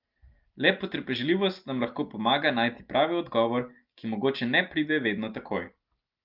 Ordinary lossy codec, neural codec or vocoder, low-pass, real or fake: Opus, 32 kbps; none; 5.4 kHz; real